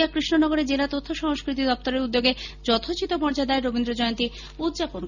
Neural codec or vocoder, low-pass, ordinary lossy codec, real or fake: none; 7.2 kHz; none; real